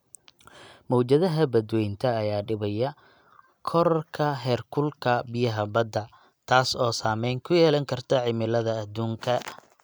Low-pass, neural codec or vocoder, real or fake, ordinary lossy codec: none; none; real; none